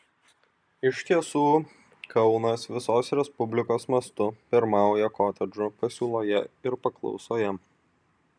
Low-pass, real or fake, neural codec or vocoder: 9.9 kHz; real; none